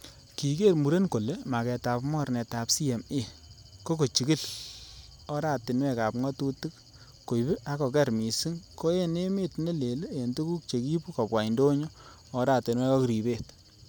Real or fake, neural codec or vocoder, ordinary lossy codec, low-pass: real; none; none; none